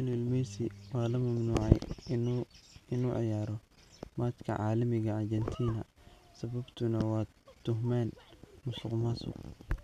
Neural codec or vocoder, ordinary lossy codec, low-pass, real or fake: none; none; 14.4 kHz; real